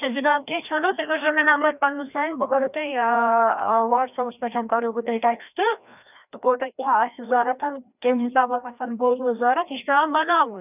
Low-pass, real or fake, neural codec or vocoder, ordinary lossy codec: 3.6 kHz; fake; codec, 16 kHz, 1 kbps, FreqCodec, larger model; none